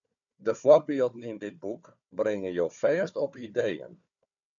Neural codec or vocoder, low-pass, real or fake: codec, 16 kHz, 4 kbps, FunCodec, trained on Chinese and English, 50 frames a second; 7.2 kHz; fake